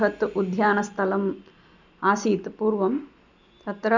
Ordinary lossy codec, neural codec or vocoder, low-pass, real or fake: none; none; 7.2 kHz; real